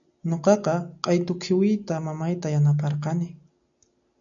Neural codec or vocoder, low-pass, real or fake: none; 7.2 kHz; real